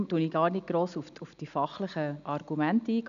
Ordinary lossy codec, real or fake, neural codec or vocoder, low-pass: none; real; none; 7.2 kHz